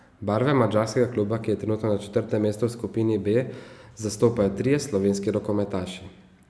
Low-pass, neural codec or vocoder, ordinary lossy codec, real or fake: none; none; none; real